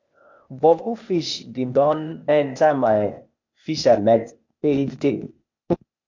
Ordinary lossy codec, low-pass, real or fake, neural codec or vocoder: MP3, 64 kbps; 7.2 kHz; fake; codec, 16 kHz, 0.8 kbps, ZipCodec